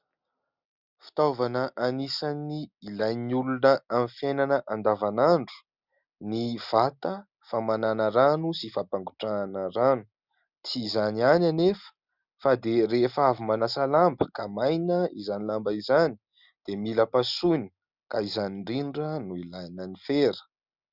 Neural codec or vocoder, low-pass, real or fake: none; 5.4 kHz; real